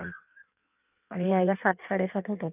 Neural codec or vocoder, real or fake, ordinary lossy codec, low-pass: codec, 16 kHz in and 24 kHz out, 1.1 kbps, FireRedTTS-2 codec; fake; none; 3.6 kHz